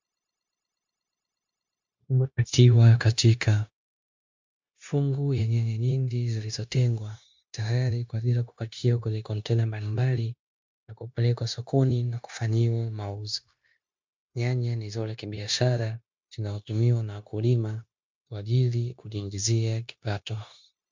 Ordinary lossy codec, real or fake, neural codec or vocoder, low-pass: MP3, 64 kbps; fake; codec, 16 kHz, 0.9 kbps, LongCat-Audio-Codec; 7.2 kHz